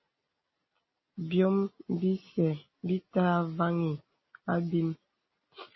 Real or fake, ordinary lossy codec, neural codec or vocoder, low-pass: real; MP3, 24 kbps; none; 7.2 kHz